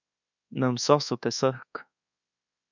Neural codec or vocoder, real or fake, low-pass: codec, 24 kHz, 1.2 kbps, DualCodec; fake; 7.2 kHz